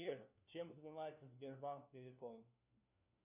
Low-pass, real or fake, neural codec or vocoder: 3.6 kHz; fake; codec, 16 kHz, 1 kbps, FunCodec, trained on LibriTTS, 50 frames a second